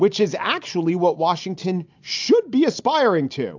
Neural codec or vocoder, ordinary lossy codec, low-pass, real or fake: none; AAC, 48 kbps; 7.2 kHz; real